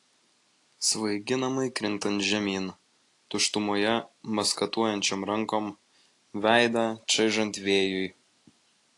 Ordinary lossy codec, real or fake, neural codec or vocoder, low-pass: AAC, 48 kbps; real; none; 10.8 kHz